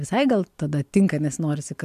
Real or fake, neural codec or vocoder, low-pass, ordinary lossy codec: real; none; 14.4 kHz; MP3, 96 kbps